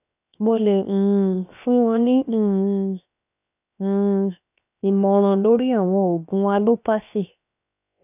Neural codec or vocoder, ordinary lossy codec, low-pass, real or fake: codec, 16 kHz, 0.7 kbps, FocalCodec; none; 3.6 kHz; fake